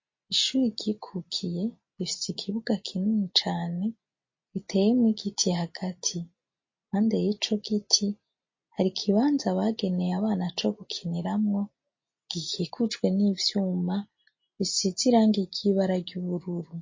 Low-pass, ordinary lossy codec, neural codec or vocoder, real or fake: 7.2 kHz; MP3, 32 kbps; none; real